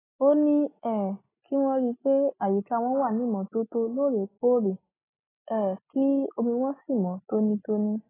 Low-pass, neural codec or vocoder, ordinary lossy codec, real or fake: 3.6 kHz; none; AAC, 16 kbps; real